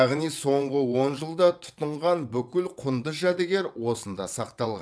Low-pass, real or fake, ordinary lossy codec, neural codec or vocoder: none; fake; none; vocoder, 22.05 kHz, 80 mel bands, WaveNeXt